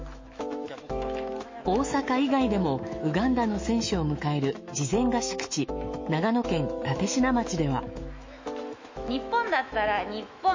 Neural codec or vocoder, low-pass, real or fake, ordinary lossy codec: autoencoder, 48 kHz, 128 numbers a frame, DAC-VAE, trained on Japanese speech; 7.2 kHz; fake; MP3, 32 kbps